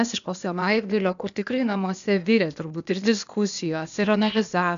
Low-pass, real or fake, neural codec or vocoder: 7.2 kHz; fake; codec, 16 kHz, 0.8 kbps, ZipCodec